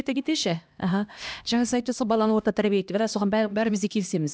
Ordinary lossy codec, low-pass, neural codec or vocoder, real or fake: none; none; codec, 16 kHz, 1 kbps, X-Codec, HuBERT features, trained on LibriSpeech; fake